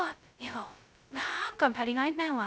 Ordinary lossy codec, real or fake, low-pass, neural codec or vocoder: none; fake; none; codec, 16 kHz, 0.2 kbps, FocalCodec